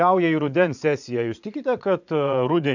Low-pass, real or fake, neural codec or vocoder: 7.2 kHz; fake; vocoder, 22.05 kHz, 80 mel bands, Vocos